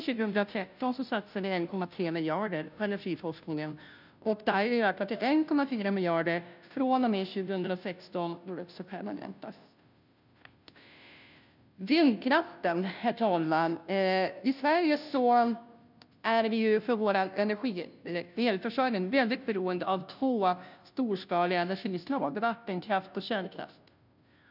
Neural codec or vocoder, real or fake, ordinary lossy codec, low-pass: codec, 16 kHz, 0.5 kbps, FunCodec, trained on Chinese and English, 25 frames a second; fake; none; 5.4 kHz